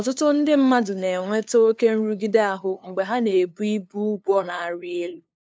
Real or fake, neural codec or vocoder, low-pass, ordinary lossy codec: fake; codec, 16 kHz, 2 kbps, FunCodec, trained on LibriTTS, 25 frames a second; none; none